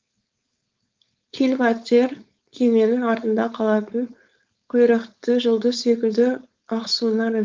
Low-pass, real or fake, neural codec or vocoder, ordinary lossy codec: 7.2 kHz; fake; codec, 16 kHz, 4.8 kbps, FACodec; Opus, 32 kbps